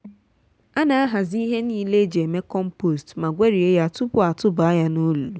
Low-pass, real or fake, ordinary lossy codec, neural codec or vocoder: none; real; none; none